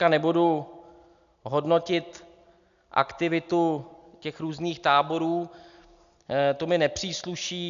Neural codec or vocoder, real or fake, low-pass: none; real; 7.2 kHz